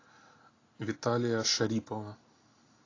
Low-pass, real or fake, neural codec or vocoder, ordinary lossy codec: 7.2 kHz; real; none; AAC, 32 kbps